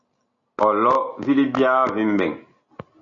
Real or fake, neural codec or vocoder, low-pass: real; none; 7.2 kHz